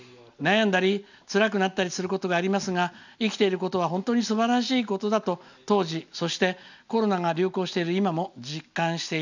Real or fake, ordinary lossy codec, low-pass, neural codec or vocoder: real; none; 7.2 kHz; none